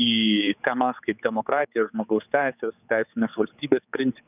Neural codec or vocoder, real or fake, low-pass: codec, 16 kHz, 4 kbps, X-Codec, HuBERT features, trained on general audio; fake; 3.6 kHz